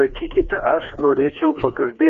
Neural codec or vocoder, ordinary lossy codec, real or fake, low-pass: codec, 16 kHz, 2 kbps, FreqCodec, larger model; Opus, 64 kbps; fake; 7.2 kHz